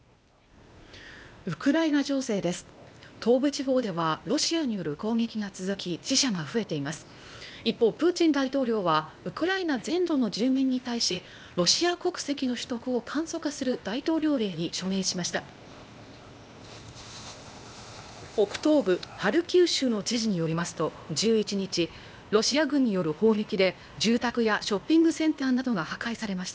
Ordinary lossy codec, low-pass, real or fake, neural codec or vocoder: none; none; fake; codec, 16 kHz, 0.8 kbps, ZipCodec